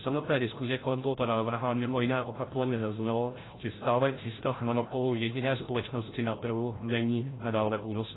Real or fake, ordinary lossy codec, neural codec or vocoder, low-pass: fake; AAC, 16 kbps; codec, 16 kHz, 0.5 kbps, FreqCodec, larger model; 7.2 kHz